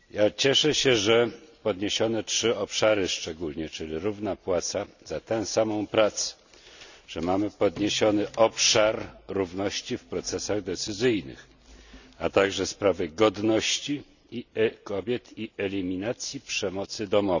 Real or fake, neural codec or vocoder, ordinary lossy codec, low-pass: real; none; none; 7.2 kHz